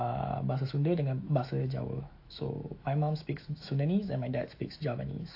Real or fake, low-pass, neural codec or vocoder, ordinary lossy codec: fake; 5.4 kHz; autoencoder, 48 kHz, 128 numbers a frame, DAC-VAE, trained on Japanese speech; AAC, 32 kbps